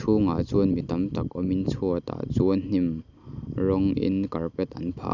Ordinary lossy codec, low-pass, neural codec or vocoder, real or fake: none; 7.2 kHz; none; real